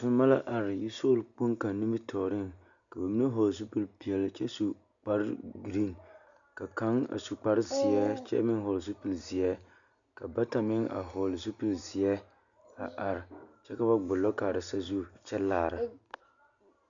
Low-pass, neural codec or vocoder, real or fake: 7.2 kHz; none; real